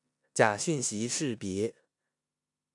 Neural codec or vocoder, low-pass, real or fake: codec, 16 kHz in and 24 kHz out, 0.9 kbps, LongCat-Audio-Codec, four codebook decoder; 10.8 kHz; fake